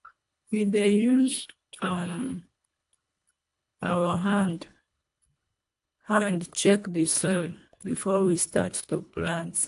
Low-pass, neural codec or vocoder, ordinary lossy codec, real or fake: 10.8 kHz; codec, 24 kHz, 1.5 kbps, HILCodec; AAC, 64 kbps; fake